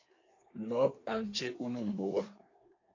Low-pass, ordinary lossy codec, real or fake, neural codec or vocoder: 7.2 kHz; AAC, 32 kbps; fake; codec, 24 kHz, 1 kbps, SNAC